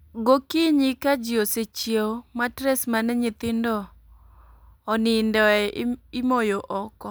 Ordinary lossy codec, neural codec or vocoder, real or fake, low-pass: none; none; real; none